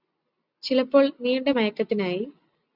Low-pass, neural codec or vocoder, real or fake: 5.4 kHz; none; real